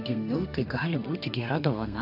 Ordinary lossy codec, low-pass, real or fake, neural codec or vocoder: AAC, 48 kbps; 5.4 kHz; fake; codec, 32 kHz, 1.9 kbps, SNAC